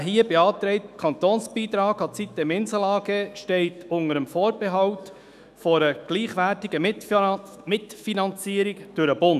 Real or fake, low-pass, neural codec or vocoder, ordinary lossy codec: fake; 14.4 kHz; autoencoder, 48 kHz, 128 numbers a frame, DAC-VAE, trained on Japanese speech; none